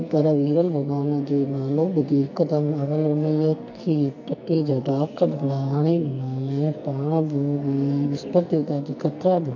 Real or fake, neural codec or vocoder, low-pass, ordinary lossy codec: fake; codec, 44.1 kHz, 2.6 kbps, SNAC; 7.2 kHz; none